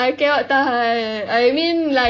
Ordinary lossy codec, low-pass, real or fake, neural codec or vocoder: AAC, 32 kbps; 7.2 kHz; real; none